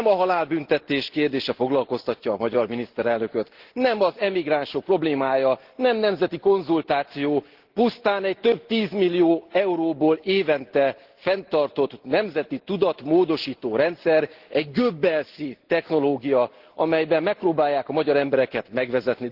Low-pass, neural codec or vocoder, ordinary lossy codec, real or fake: 5.4 kHz; none; Opus, 16 kbps; real